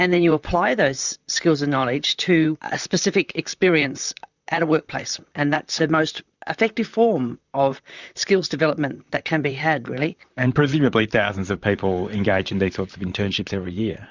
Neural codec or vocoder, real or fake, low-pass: vocoder, 44.1 kHz, 128 mel bands, Pupu-Vocoder; fake; 7.2 kHz